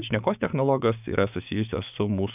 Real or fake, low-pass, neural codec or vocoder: fake; 3.6 kHz; codec, 16 kHz, 6 kbps, DAC